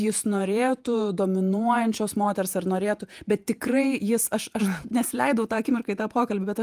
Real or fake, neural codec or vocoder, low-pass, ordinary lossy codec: fake; vocoder, 48 kHz, 128 mel bands, Vocos; 14.4 kHz; Opus, 32 kbps